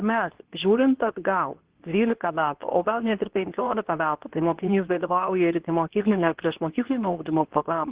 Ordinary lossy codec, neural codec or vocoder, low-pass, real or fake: Opus, 16 kbps; codec, 24 kHz, 0.9 kbps, WavTokenizer, medium speech release version 2; 3.6 kHz; fake